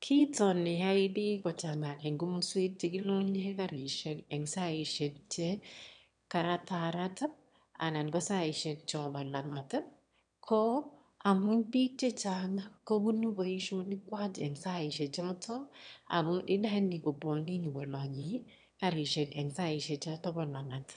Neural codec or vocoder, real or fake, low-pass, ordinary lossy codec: autoencoder, 22.05 kHz, a latent of 192 numbers a frame, VITS, trained on one speaker; fake; 9.9 kHz; none